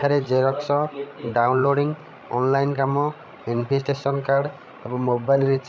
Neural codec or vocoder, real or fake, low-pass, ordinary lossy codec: codec, 16 kHz, 16 kbps, FreqCodec, larger model; fake; none; none